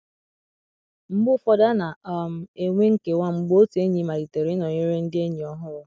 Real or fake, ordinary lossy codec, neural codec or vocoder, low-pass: real; none; none; none